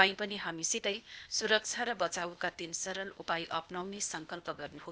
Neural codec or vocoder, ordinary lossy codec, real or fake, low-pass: codec, 16 kHz, 0.8 kbps, ZipCodec; none; fake; none